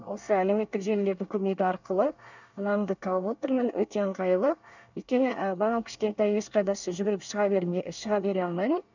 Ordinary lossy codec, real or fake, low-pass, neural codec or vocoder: none; fake; 7.2 kHz; codec, 24 kHz, 1 kbps, SNAC